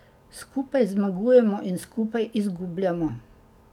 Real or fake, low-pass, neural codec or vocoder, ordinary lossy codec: fake; 19.8 kHz; codec, 44.1 kHz, 7.8 kbps, DAC; none